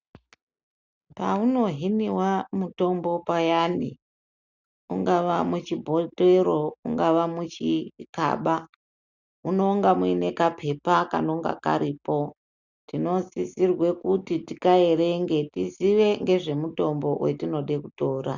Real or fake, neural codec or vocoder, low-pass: real; none; 7.2 kHz